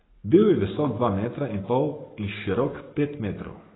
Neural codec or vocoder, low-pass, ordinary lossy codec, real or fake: codec, 44.1 kHz, 7.8 kbps, Pupu-Codec; 7.2 kHz; AAC, 16 kbps; fake